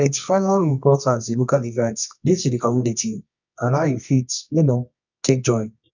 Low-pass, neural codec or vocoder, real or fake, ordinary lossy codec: 7.2 kHz; codec, 24 kHz, 0.9 kbps, WavTokenizer, medium music audio release; fake; none